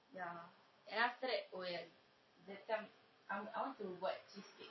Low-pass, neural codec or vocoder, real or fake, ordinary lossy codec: 7.2 kHz; none; real; MP3, 24 kbps